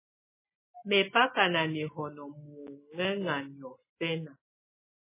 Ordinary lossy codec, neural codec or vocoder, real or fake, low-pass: MP3, 16 kbps; none; real; 3.6 kHz